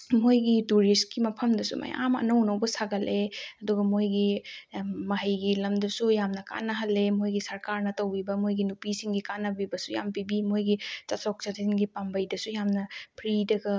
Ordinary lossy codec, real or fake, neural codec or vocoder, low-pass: none; real; none; none